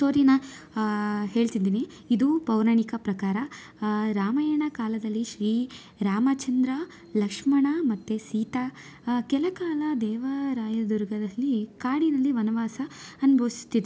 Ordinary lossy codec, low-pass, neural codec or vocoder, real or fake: none; none; none; real